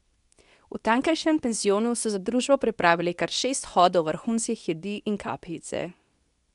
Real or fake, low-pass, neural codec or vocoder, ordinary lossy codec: fake; 10.8 kHz; codec, 24 kHz, 0.9 kbps, WavTokenizer, medium speech release version 2; none